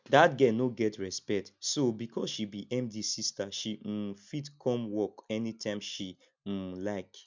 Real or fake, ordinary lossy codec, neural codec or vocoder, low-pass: real; MP3, 64 kbps; none; 7.2 kHz